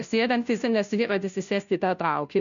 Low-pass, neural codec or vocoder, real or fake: 7.2 kHz; codec, 16 kHz, 0.5 kbps, FunCodec, trained on Chinese and English, 25 frames a second; fake